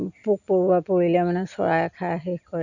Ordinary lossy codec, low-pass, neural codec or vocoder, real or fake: none; 7.2 kHz; none; real